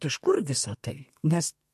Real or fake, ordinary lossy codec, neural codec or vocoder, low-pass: fake; MP3, 64 kbps; codec, 32 kHz, 1.9 kbps, SNAC; 14.4 kHz